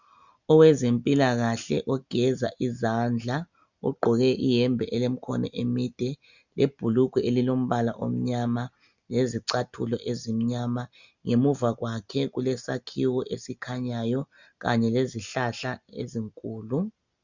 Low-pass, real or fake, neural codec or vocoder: 7.2 kHz; real; none